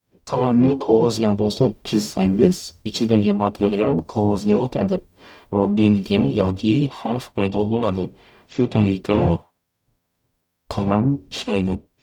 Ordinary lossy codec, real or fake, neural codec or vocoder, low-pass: none; fake; codec, 44.1 kHz, 0.9 kbps, DAC; 19.8 kHz